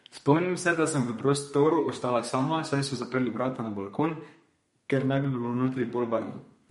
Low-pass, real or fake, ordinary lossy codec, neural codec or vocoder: 14.4 kHz; fake; MP3, 48 kbps; codec, 32 kHz, 1.9 kbps, SNAC